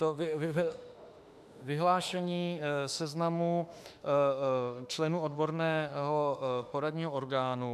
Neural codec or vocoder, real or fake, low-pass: autoencoder, 48 kHz, 32 numbers a frame, DAC-VAE, trained on Japanese speech; fake; 14.4 kHz